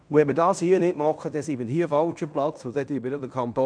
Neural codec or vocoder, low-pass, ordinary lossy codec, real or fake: codec, 16 kHz in and 24 kHz out, 0.9 kbps, LongCat-Audio-Codec, fine tuned four codebook decoder; 9.9 kHz; none; fake